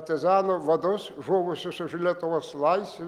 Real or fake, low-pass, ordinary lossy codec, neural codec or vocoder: real; 19.8 kHz; Opus, 24 kbps; none